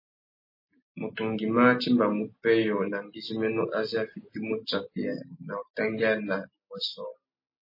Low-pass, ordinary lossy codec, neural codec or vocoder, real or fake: 5.4 kHz; MP3, 24 kbps; none; real